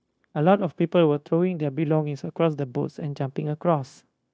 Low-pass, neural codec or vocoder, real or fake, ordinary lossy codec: none; codec, 16 kHz, 0.9 kbps, LongCat-Audio-Codec; fake; none